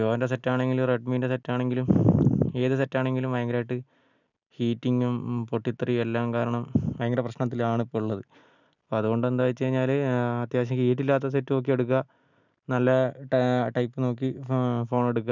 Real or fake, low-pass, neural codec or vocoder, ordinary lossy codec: real; 7.2 kHz; none; none